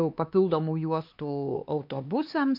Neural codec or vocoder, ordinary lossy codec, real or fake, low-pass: codec, 16 kHz, 4 kbps, X-Codec, HuBERT features, trained on LibriSpeech; MP3, 48 kbps; fake; 5.4 kHz